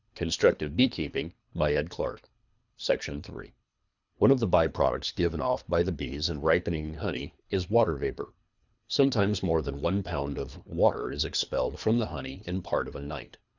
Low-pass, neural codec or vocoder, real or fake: 7.2 kHz; codec, 24 kHz, 3 kbps, HILCodec; fake